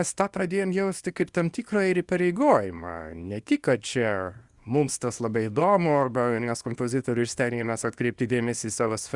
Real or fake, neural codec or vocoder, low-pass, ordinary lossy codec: fake; codec, 24 kHz, 0.9 kbps, WavTokenizer, small release; 10.8 kHz; Opus, 64 kbps